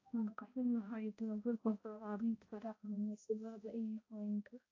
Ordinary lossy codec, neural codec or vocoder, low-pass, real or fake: AAC, 32 kbps; codec, 16 kHz, 0.5 kbps, X-Codec, HuBERT features, trained on balanced general audio; 7.2 kHz; fake